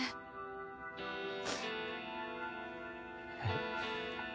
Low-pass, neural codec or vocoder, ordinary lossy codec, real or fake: none; none; none; real